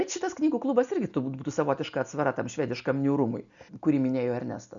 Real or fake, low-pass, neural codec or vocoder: real; 7.2 kHz; none